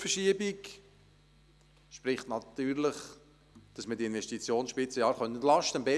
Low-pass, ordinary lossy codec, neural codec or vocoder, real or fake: none; none; none; real